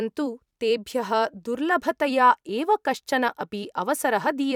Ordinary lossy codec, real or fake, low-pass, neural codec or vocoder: none; fake; 19.8 kHz; vocoder, 44.1 kHz, 128 mel bands every 256 samples, BigVGAN v2